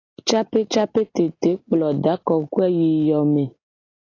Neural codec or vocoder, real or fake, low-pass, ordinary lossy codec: none; real; 7.2 kHz; AAC, 32 kbps